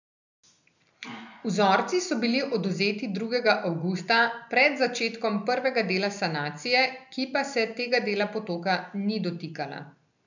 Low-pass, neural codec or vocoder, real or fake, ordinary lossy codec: 7.2 kHz; none; real; none